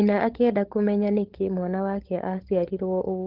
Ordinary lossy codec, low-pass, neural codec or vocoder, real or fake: Opus, 16 kbps; 5.4 kHz; codec, 16 kHz, 16 kbps, FunCodec, trained on LibriTTS, 50 frames a second; fake